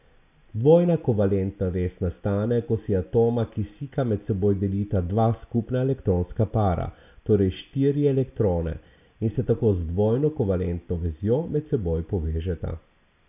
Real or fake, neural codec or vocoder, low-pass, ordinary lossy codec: real; none; 3.6 kHz; none